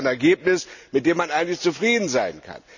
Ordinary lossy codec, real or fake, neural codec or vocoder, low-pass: none; real; none; 7.2 kHz